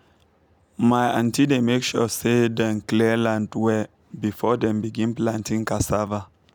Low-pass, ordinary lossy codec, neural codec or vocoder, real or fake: none; none; none; real